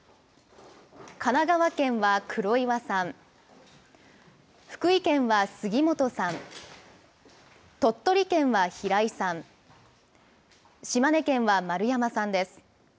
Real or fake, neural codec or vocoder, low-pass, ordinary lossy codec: real; none; none; none